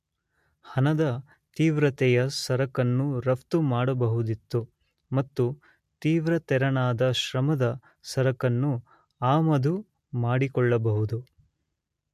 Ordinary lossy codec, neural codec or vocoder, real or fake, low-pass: MP3, 64 kbps; none; real; 14.4 kHz